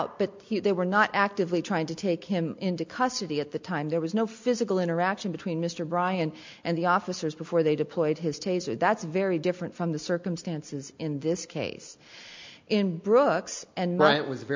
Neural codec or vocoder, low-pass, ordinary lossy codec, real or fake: none; 7.2 kHz; MP3, 64 kbps; real